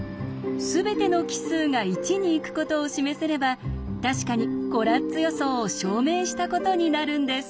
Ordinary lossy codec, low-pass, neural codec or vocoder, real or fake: none; none; none; real